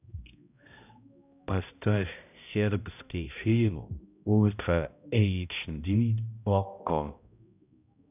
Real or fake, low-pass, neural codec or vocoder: fake; 3.6 kHz; codec, 16 kHz, 0.5 kbps, X-Codec, HuBERT features, trained on balanced general audio